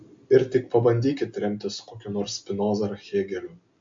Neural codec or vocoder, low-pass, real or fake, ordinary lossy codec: none; 7.2 kHz; real; MP3, 48 kbps